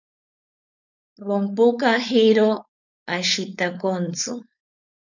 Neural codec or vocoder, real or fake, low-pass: codec, 16 kHz, 4.8 kbps, FACodec; fake; 7.2 kHz